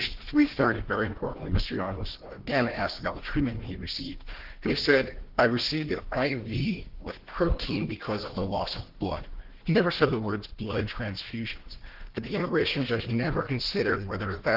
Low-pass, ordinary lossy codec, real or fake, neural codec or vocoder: 5.4 kHz; Opus, 16 kbps; fake; codec, 16 kHz, 1 kbps, FunCodec, trained on Chinese and English, 50 frames a second